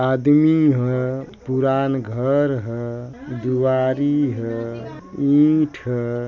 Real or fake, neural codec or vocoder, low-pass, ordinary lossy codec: real; none; 7.2 kHz; none